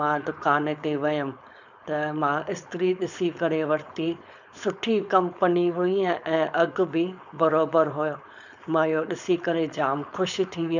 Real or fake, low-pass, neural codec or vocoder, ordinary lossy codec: fake; 7.2 kHz; codec, 16 kHz, 4.8 kbps, FACodec; none